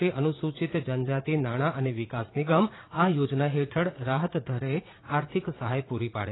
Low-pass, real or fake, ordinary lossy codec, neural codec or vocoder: 7.2 kHz; fake; AAC, 16 kbps; vocoder, 44.1 kHz, 80 mel bands, Vocos